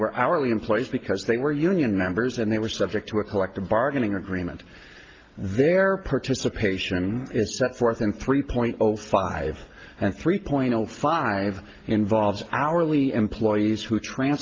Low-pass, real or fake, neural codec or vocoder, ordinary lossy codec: 7.2 kHz; real; none; Opus, 32 kbps